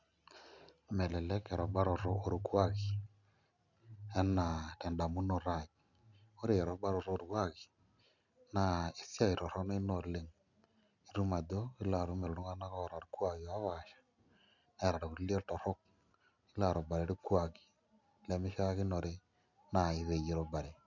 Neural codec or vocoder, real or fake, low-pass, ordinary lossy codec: none; real; 7.2 kHz; none